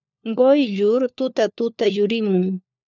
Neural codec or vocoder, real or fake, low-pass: codec, 16 kHz, 4 kbps, FunCodec, trained on LibriTTS, 50 frames a second; fake; 7.2 kHz